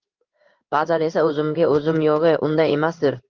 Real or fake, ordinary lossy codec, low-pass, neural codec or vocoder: fake; Opus, 24 kbps; 7.2 kHz; codec, 16 kHz in and 24 kHz out, 1 kbps, XY-Tokenizer